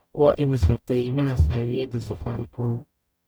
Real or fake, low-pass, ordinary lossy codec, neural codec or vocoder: fake; none; none; codec, 44.1 kHz, 0.9 kbps, DAC